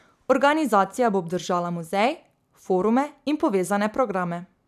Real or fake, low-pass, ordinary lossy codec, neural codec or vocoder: real; 14.4 kHz; none; none